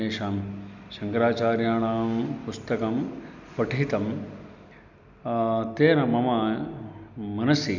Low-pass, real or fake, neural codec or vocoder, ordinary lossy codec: 7.2 kHz; real; none; none